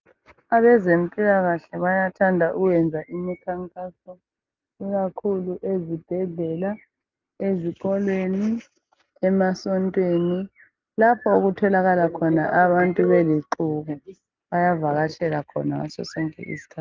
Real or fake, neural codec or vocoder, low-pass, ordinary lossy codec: real; none; 7.2 kHz; Opus, 24 kbps